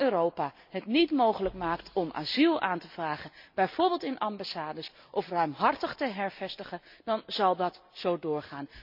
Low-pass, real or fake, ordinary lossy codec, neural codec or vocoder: 5.4 kHz; real; MP3, 48 kbps; none